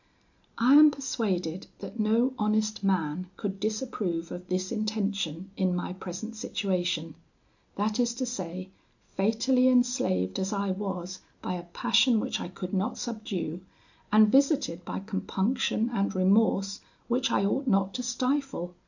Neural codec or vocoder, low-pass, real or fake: none; 7.2 kHz; real